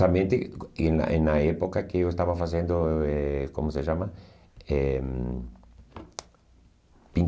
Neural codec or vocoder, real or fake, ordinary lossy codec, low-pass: none; real; none; none